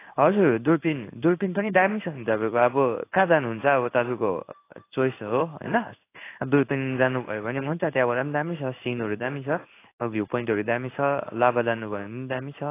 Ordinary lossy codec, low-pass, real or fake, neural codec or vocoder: AAC, 24 kbps; 3.6 kHz; fake; codec, 16 kHz in and 24 kHz out, 1 kbps, XY-Tokenizer